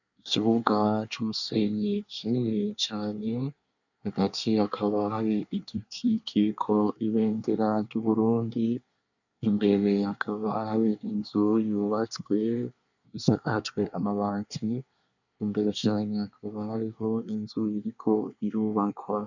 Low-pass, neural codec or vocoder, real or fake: 7.2 kHz; codec, 24 kHz, 1 kbps, SNAC; fake